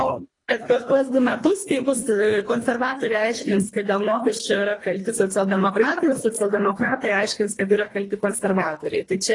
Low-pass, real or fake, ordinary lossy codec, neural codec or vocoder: 10.8 kHz; fake; AAC, 32 kbps; codec, 24 kHz, 1.5 kbps, HILCodec